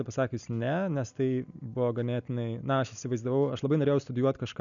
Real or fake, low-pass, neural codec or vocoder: real; 7.2 kHz; none